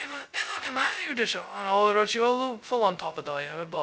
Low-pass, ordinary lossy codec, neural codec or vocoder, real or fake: none; none; codec, 16 kHz, 0.2 kbps, FocalCodec; fake